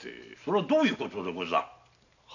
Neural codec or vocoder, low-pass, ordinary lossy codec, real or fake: none; 7.2 kHz; none; real